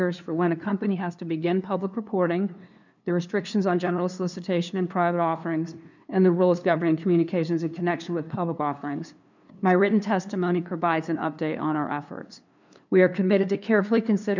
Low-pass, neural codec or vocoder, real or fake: 7.2 kHz; codec, 16 kHz, 2 kbps, FunCodec, trained on LibriTTS, 25 frames a second; fake